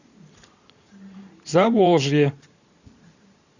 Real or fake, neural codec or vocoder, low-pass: fake; vocoder, 44.1 kHz, 128 mel bands every 512 samples, BigVGAN v2; 7.2 kHz